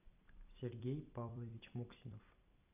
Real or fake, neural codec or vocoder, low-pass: fake; vocoder, 44.1 kHz, 128 mel bands every 256 samples, BigVGAN v2; 3.6 kHz